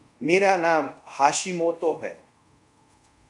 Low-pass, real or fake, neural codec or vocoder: 10.8 kHz; fake; codec, 24 kHz, 0.5 kbps, DualCodec